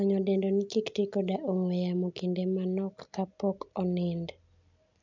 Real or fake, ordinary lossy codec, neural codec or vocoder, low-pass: real; none; none; 7.2 kHz